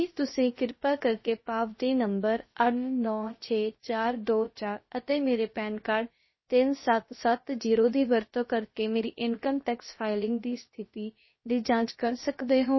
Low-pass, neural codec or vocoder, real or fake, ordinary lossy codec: 7.2 kHz; codec, 16 kHz, about 1 kbps, DyCAST, with the encoder's durations; fake; MP3, 24 kbps